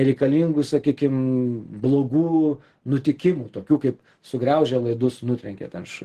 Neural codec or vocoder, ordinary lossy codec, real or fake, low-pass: none; Opus, 16 kbps; real; 14.4 kHz